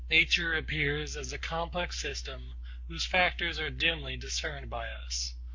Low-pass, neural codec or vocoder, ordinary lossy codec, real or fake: 7.2 kHz; codec, 44.1 kHz, 7.8 kbps, Pupu-Codec; MP3, 48 kbps; fake